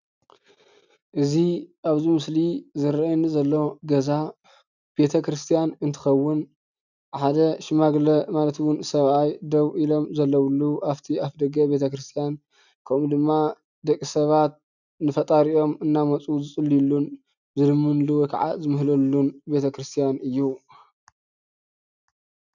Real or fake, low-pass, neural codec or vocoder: real; 7.2 kHz; none